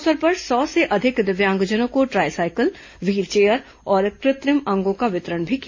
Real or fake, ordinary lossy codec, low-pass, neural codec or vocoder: real; MP3, 32 kbps; 7.2 kHz; none